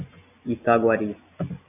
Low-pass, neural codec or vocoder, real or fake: 3.6 kHz; none; real